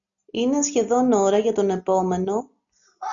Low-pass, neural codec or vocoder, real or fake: 7.2 kHz; none; real